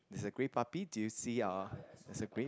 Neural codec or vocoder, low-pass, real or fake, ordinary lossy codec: none; none; real; none